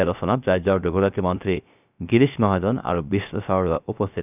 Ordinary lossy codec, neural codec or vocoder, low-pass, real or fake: none; codec, 16 kHz, 0.3 kbps, FocalCodec; 3.6 kHz; fake